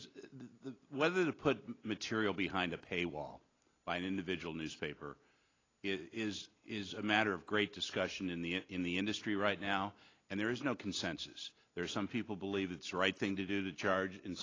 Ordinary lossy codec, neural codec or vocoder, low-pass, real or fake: AAC, 32 kbps; none; 7.2 kHz; real